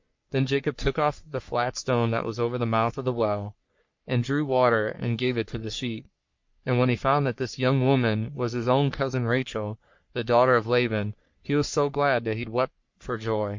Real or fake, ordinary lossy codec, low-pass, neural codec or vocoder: fake; MP3, 48 kbps; 7.2 kHz; codec, 44.1 kHz, 3.4 kbps, Pupu-Codec